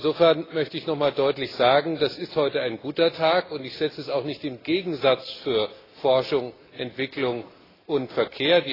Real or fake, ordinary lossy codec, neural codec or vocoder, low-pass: real; AAC, 24 kbps; none; 5.4 kHz